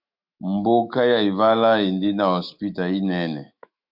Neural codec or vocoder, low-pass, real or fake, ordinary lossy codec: autoencoder, 48 kHz, 128 numbers a frame, DAC-VAE, trained on Japanese speech; 5.4 kHz; fake; MP3, 48 kbps